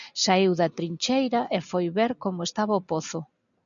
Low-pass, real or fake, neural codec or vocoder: 7.2 kHz; real; none